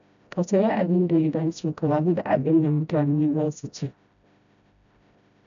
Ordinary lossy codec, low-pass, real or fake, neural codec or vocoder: none; 7.2 kHz; fake; codec, 16 kHz, 0.5 kbps, FreqCodec, smaller model